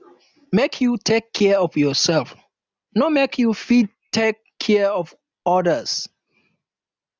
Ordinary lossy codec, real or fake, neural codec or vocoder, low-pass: none; real; none; none